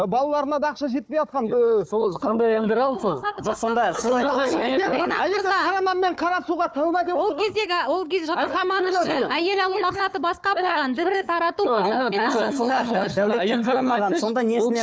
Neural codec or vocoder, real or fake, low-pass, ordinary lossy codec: codec, 16 kHz, 4 kbps, FunCodec, trained on Chinese and English, 50 frames a second; fake; none; none